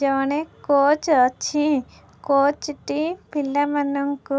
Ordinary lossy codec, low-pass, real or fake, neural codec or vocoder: none; none; real; none